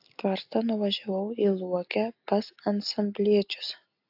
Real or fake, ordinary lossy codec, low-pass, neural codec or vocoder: real; AAC, 48 kbps; 5.4 kHz; none